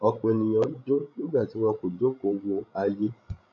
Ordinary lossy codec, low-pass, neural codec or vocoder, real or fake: none; 7.2 kHz; codec, 16 kHz, 16 kbps, FreqCodec, larger model; fake